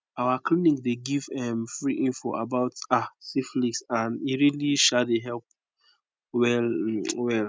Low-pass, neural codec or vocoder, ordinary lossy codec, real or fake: none; none; none; real